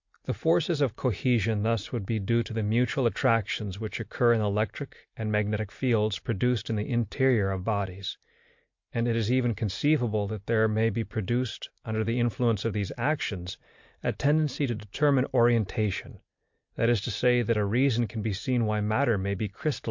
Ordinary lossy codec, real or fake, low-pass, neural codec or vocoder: MP3, 48 kbps; real; 7.2 kHz; none